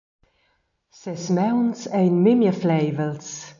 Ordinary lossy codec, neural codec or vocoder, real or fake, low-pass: AAC, 64 kbps; none; real; 7.2 kHz